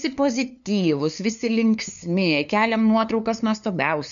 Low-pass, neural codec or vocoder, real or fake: 7.2 kHz; codec, 16 kHz, 2 kbps, FunCodec, trained on LibriTTS, 25 frames a second; fake